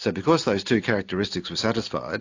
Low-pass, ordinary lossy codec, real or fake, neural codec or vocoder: 7.2 kHz; AAC, 48 kbps; real; none